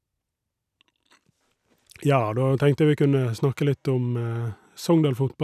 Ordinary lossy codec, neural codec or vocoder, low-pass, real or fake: none; none; 14.4 kHz; real